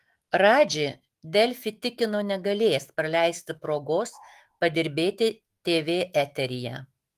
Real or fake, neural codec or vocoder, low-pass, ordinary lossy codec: fake; autoencoder, 48 kHz, 128 numbers a frame, DAC-VAE, trained on Japanese speech; 14.4 kHz; Opus, 24 kbps